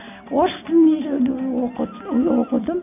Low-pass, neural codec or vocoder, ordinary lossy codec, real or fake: 3.6 kHz; none; none; real